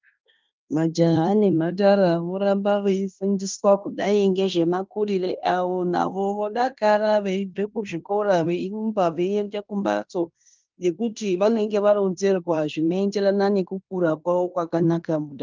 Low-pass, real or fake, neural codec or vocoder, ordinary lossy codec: 7.2 kHz; fake; codec, 16 kHz in and 24 kHz out, 0.9 kbps, LongCat-Audio-Codec, fine tuned four codebook decoder; Opus, 24 kbps